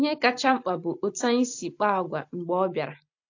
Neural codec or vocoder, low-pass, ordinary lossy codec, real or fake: none; 7.2 kHz; AAC, 48 kbps; real